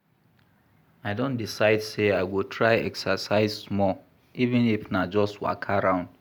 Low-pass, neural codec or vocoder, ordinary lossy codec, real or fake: none; none; none; real